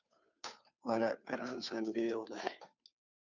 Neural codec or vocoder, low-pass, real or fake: codec, 16 kHz, 2 kbps, FunCodec, trained on Chinese and English, 25 frames a second; 7.2 kHz; fake